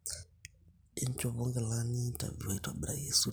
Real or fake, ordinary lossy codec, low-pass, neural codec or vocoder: real; none; none; none